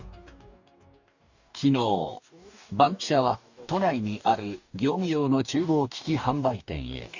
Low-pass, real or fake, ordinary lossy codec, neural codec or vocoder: 7.2 kHz; fake; none; codec, 44.1 kHz, 2.6 kbps, DAC